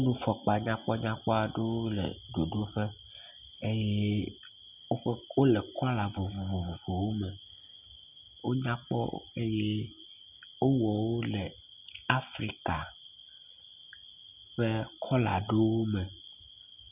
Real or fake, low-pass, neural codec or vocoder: real; 3.6 kHz; none